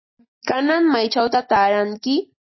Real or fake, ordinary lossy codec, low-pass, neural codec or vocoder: real; MP3, 24 kbps; 7.2 kHz; none